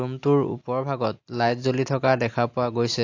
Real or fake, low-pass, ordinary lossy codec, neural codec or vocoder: real; 7.2 kHz; AAC, 48 kbps; none